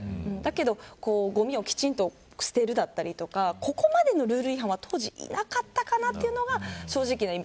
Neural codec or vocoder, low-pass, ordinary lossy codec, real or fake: none; none; none; real